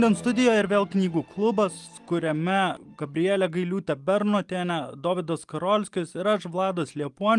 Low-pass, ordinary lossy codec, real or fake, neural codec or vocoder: 10.8 kHz; Opus, 32 kbps; real; none